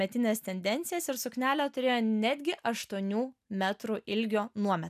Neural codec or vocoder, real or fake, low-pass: none; real; 14.4 kHz